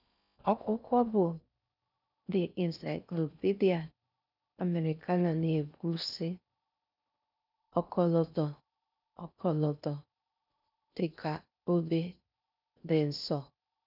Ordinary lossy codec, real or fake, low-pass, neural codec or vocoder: none; fake; 5.4 kHz; codec, 16 kHz in and 24 kHz out, 0.6 kbps, FocalCodec, streaming, 4096 codes